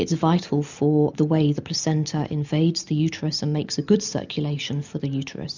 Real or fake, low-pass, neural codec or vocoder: real; 7.2 kHz; none